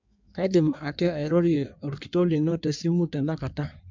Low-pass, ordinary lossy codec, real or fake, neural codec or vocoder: 7.2 kHz; MP3, 64 kbps; fake; codec, 16 kHz in and 24 kHz out, 1.1 kbps, FireRedTTS-2 codec